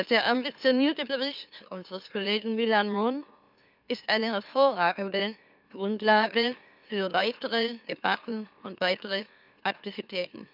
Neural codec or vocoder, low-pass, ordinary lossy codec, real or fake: autoencoder, 44.1 kHz, a latent of 192 numbers a frame, MeloTTS; 5.4 kHz; none; fake